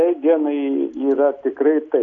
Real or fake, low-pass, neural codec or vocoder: real; 7.2 kHz; none